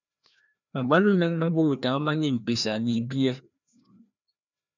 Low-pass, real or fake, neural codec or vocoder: 7.2 kHz; fake; codec, 16 kHz, 1 kbps, FreqCodec, larger model